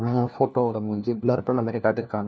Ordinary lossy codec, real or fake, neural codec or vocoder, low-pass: none; fake; codec, 16 kHz, 1 kbps, FunCodec, trained on LibriTTS, 50 frames a second; none